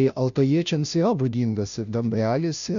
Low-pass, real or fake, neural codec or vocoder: 7.2 kHz; fake; codec, 16 kHz, 0.5 kbps, FunCodec, trained on Chinese and English, 25 frames a second